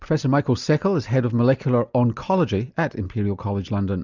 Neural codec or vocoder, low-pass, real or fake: none; 7.2 kHz; real